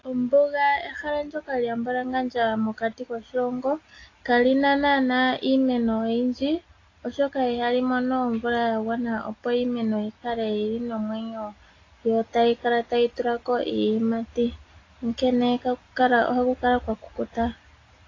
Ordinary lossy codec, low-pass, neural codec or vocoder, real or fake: AAC, 32 kbps; 7.2 kHz; none; real